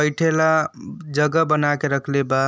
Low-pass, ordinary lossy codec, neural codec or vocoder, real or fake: none; none; none; real